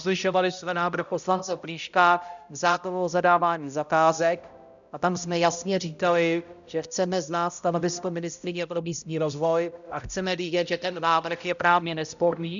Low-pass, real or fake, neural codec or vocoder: 7.2 kHz; fake; codec, 16 kHz, 0.5 kbps, X-Codec, HuBERT features, trained on balanced general audio